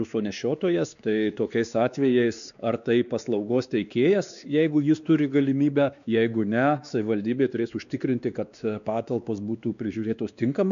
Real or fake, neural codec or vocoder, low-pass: fake; codec, 16 kHz, 2 kbps, X-Codec, WavLM features, trained on Multilingual LibriSpeech; 7.2 kHz